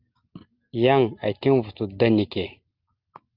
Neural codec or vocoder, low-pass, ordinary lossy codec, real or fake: none; 5.4 kHz; Opus, 24 kbps; real